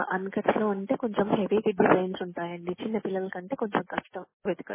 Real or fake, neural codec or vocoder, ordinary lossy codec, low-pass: real; none; MP3, 16 kbps; 3.6 kHz